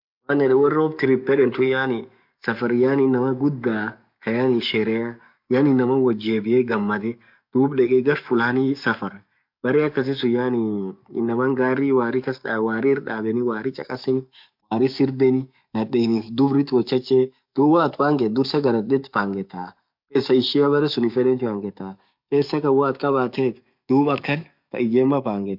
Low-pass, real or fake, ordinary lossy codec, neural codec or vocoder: 5.4 kHz; fake; MP3, 48 kbps; codec, 44.1 kHz, 7.8 kbps, DAC